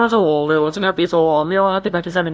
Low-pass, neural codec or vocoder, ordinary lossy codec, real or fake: none; codec, 16 kHz, 0.5 kbps, FunCodec, trained on LibriTTS, 25 frames a second; none; fake